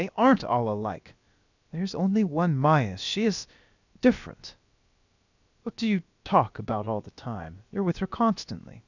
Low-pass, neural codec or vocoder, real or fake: 7.2 kHz; codec, 16 kHz, about 1 kbps, DyCAST, with the encoder's durations; fake